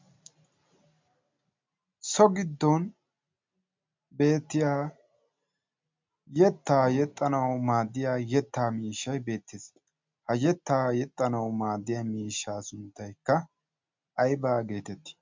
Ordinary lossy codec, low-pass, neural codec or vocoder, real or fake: MP3, 64 kbps; 7.2 kHz; none; real